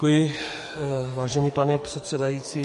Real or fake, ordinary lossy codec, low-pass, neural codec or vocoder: fake; MP3, 48 kbps; 14.4 kHz; codec, 44.1 kHz, 2.6 kbps, SNAC